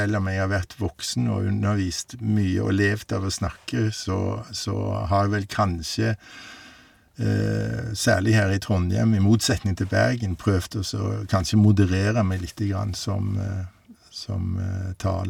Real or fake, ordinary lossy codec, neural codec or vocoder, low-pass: real; none; none; 19.8 kHz